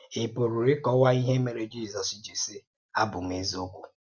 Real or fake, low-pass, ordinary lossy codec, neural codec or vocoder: real; 7.2 kHz; MP3, 64 kbps; none